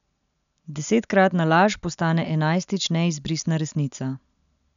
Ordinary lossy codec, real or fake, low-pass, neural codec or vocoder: none; real; 7.2 kHz; none